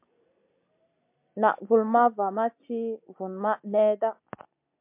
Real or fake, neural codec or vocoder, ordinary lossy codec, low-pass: real; none; MP3, 32 kbps; 3.6 kHz